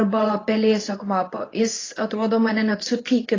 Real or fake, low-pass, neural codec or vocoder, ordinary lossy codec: fake; 7.2 kHz; codec, 24 kHz, 0.9 kbps, WavTokenizer, medium speech release version 2; AAC, 32 kbps